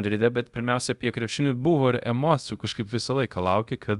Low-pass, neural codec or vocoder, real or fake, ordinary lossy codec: 10.8 kHz; codec, 24 kHz, 0.5 kbps, DualCodec; fake; Opus, 64 kbps